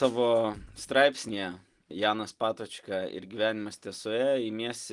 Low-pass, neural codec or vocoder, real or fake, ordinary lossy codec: 10.8 kHz; none; real; Opus, 24 kbps